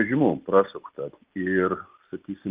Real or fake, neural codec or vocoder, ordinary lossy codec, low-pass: real; none; Opus, 24 kbps; 3.6 kHz